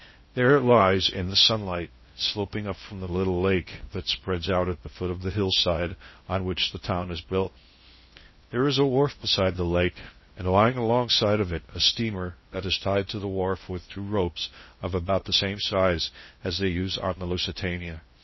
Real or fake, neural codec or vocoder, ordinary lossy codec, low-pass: fake; codec, 16 kHz in and 24 kHz out, 0.6 kbps, FocalCodec, streaming, 2048 codes; MP3, 24 kbps; 7.2 kHz